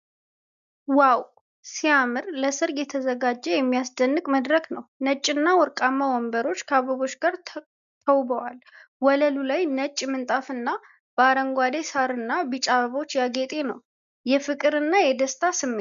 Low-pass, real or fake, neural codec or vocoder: 7.2 kHz; real; none